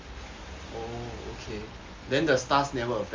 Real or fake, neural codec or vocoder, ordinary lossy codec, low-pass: real; none; Opus, 32 kbps; 7.2 kHz